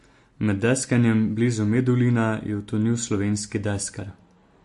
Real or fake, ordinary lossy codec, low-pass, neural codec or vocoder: real; MP3, 48 kbps; 14.4 kHz; none